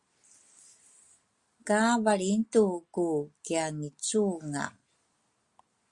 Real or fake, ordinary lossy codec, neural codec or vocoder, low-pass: real; Opus, 64 kbps; none; 10.8 kHz